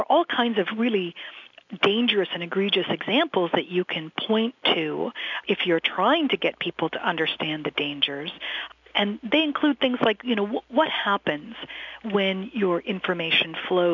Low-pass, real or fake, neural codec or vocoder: 7.2 kHz; real; none